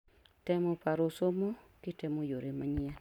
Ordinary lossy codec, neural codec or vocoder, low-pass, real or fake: none; none; 19.8 kHz; real